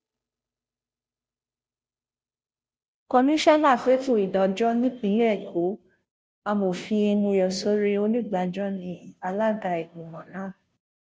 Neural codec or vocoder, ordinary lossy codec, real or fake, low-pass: codec, 16 kHz, 0.5 kbps, FunCodec, trained on Chinese and English, 25 frames a second; none; fake; none